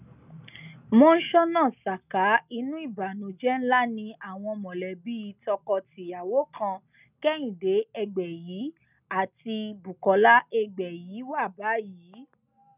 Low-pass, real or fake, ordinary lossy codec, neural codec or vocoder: 3.6 kHz; real; none; none